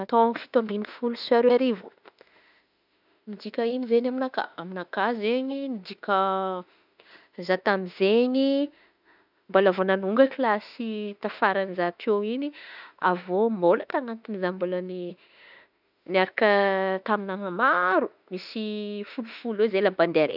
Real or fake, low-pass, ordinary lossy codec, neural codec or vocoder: fake; 5.4 kHz; none; autoencoder, 48 kHz, 32 numbers a frame, DAC-VAE, trained on Japanese speech